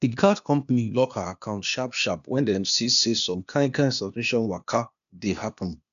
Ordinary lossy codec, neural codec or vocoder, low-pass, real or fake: AAC, 96 kbps; codec, 16 kHz, 0.8 kbps, ZipCodec; 7.2 kHz; fake